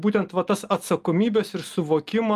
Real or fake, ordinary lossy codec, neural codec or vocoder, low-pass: real; Opus, 32 kbps; none; 14.4 kHz